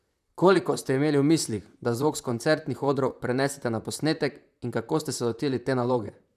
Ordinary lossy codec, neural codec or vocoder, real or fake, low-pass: none; vocoder, 44.1 kHz, 128 mel bands, Pupu-Vocoder; fake; 14.4 kHz